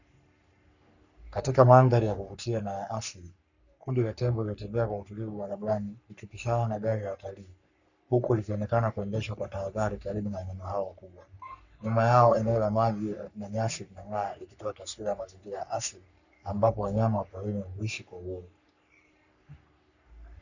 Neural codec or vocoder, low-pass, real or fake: codec, 44.1 kHz, 3.4 kbps, Pupu-Codec; 7.2 kHz; fake